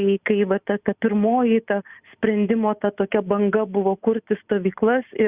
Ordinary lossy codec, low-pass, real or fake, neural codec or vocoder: Opus, 64 kbps; 3.6 kHz; real; none